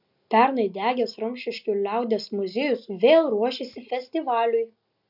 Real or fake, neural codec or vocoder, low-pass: real; none; 5.4 kHz